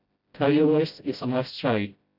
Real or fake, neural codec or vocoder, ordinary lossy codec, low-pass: fake; codec, 16 kHz, 0.5 kbps, FreqCodec, smaller model; none; 5.4 kHz